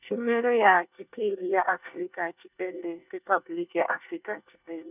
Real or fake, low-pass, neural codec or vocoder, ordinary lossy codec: fake; 3.6 kHz; codec, 24 kHz, 1 kbps, SNAC; none